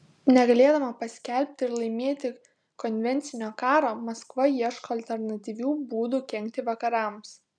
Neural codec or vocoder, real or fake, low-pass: none; real; 9.9 kHz